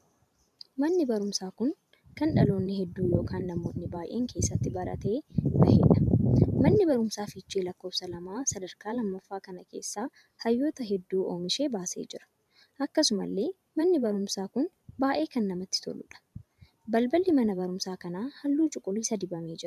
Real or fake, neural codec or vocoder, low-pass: fake; vocoder, 44.1 kHz, 128 mel bands every 512 samples, BigVGAN v2; 14.4 kHz